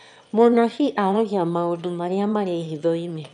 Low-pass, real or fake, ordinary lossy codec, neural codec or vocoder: 9.9 kHz; fake; none; autoencoder, 22.05 kHz, a latent of 192 numbers a frame, VITS, trained on one speaker